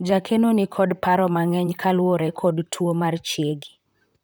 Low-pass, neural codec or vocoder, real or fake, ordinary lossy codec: none; vocoder, 44.1 kHz, 128 mel bands, Pupu-Vocoder; fake; none